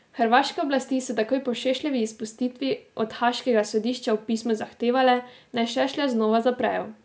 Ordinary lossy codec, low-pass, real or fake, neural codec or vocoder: none; none; real; none